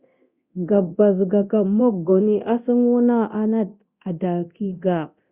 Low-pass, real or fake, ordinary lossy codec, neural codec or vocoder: 3.6 kHz; fake; Opus, 64 kbps; codec, 24 kHz, 0.9 kbps, DualCodec